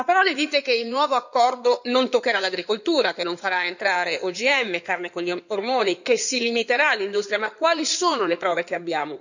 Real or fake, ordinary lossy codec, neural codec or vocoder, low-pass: fake; none; codec, 16 kHz in and 24 kHz out, 2.2 kbps, FireRedTTS-2 codec; 7.2 kHz